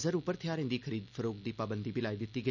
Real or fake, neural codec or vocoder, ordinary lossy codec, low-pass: real; none; none; 7.2 kHz